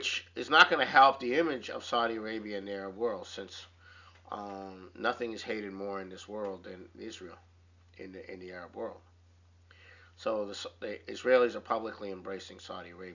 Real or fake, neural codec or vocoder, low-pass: real; none; 7.2 kHz